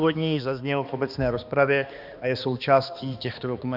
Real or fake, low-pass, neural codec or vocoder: fake; 5.4 kHz; codec, 16 kHz, 2 kbps, X-Codec, HuBERT features, trained on balanced general audio